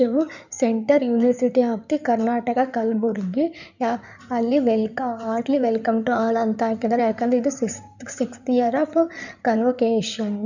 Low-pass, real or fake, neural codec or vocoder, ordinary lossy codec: 7.2 kHz; fake; codec, 16 kHz in and 24 kHz out, 2.2 kbps, FireRedTTS-2 codec; none